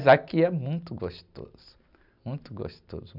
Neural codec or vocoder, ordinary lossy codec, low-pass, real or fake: none; none; 5.4 kHz; real